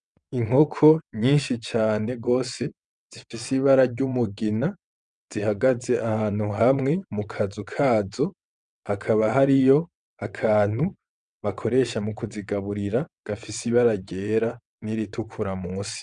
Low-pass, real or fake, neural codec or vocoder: 9.9 kHz; fake; vocoder, 22.05 kHz, 80 mel bands, WaveNeXt